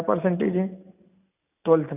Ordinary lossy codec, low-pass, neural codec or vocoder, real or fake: none; 3.6 kHz; none; real